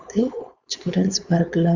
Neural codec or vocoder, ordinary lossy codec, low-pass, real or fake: codec, 16 kHz, 4.8 kbps, FACodec; Opus, 64 kbps; 7.2 kHz; fake